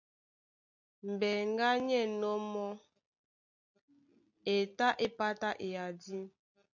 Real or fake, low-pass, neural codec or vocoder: real; 7.2 kHz; none